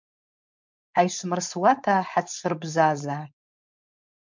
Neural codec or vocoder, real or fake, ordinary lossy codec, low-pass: codec, 16 kHz, 4.8 kbps, FACodec; fake; MP3, 64 kbps; 7.2 kHz